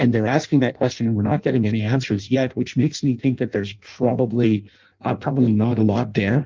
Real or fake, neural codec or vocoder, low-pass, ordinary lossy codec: fake; codec, 16 kHz in and 24 kHz out, 0.6 kbps, FireRedTTS-2 codec; 7.2 kHz; Opus, 24 kbps